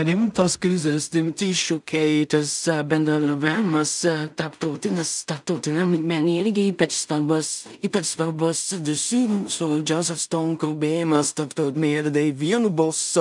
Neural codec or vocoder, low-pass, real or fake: codec, 16 kHz in and 24 kHz out, 0.4 kbps, LongCat-Audio-Codec, two codebook decoder; 10.8 kHz; fake